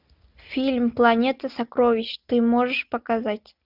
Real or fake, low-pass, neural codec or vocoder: real; 5.4 kHz; none